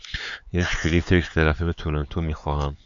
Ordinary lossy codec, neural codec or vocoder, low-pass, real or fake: AAC, 64 kbps; codec, 16 kHz, 4 kbps, X-Codec, HuBERT features, trained on LibriSpeech; 7.2 kHz; fake